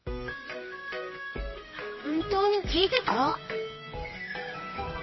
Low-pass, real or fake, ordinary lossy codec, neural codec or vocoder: 7.2 kHz; fake; MP3, 24 kbps; codec, 24 kHz, 0.9 kbps, WavTokenizer, medium music audio release